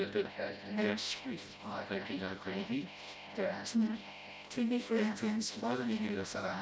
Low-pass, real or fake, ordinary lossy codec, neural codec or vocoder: none; fake; none; codec, 16 kHz, 0.5 kbps, FreqCodec, smaller model